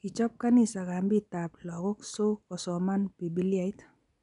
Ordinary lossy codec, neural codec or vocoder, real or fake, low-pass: none; none; real; 10.8 kHz